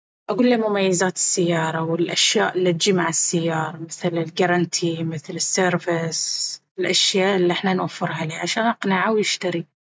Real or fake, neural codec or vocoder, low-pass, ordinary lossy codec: real; none; none; none